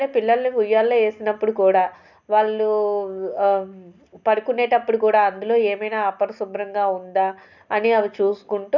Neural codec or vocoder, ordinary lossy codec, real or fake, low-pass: none; none; real; 7.2 kHz